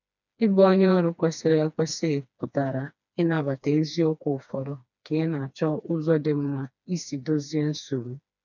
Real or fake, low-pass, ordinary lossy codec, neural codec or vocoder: fake; 7.2 kHz; none; codec, 16 kHz, 2 kbps, FreqCodec, smaller model